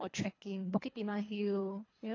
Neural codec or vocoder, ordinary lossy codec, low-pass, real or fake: codec, 24 kHz, 3 kbps, HILCodec; AAC, 32 kbps; 7.2 kHz; fake